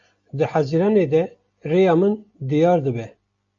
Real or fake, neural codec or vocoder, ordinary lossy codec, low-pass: real; none; AAC, 64 kbps; 7.2 kHz